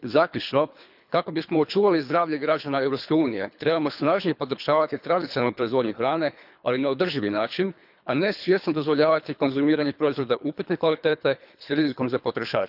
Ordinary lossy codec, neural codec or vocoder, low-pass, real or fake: none; codec, 24 kHz, 3 kbps, HILCodec; 5.4 kHz; fake